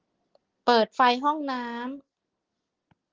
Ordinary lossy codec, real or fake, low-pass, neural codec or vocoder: Opus, 16 kbps; real; 7.2 kHz; none